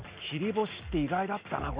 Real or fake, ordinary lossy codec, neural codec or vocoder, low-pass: real; Opus, 64 kbps; none; 3.6 kHz